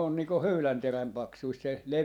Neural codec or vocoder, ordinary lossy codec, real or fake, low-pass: vocoder, 44.1 kHz, 128 mel bands every 512 samples, BigVGAN v2; none; fake; 19.8 kHz